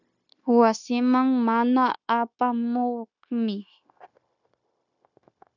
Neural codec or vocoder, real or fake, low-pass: codec, 16 kHz, 0.9 kbps, LongCat-Audio-Codec; fake; 7.2 kHz